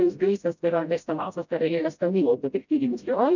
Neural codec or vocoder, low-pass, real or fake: codec, 16 kHz, 0.5 kbps, FreqCodec, smaller model; 7.2 kHz; fake